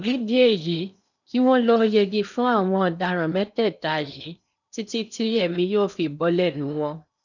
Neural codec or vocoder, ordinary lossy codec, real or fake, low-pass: codec, 16 kHz in and 24 kHz out, 0.8 kbps, FocalCodec, streaming, 65536 codes; none; fake; 7.2 kHz